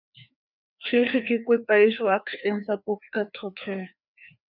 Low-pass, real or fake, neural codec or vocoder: 5.4 kHz; fake; codec, 16 kHz, 2 kbps, FreqCodec, larger model